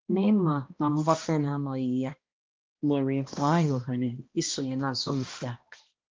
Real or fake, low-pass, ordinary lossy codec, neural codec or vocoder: fake; 7.2 kHz; Opus, 32 kbps; codec, 16 kHz, 1 kbps, X-Codec, HuBERT features, trained on balanced general audio